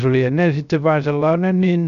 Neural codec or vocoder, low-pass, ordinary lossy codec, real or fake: codec, 16 kHz, 0.3 kbps, FocalCodec; 7.2 kHz; none; fake